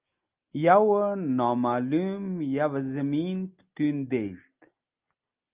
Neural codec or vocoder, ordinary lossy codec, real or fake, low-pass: none; Opus, 24 kbps; real; 3.6 kHz